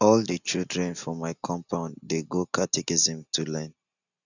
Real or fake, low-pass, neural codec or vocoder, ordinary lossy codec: real; 7.2 kHz; none; none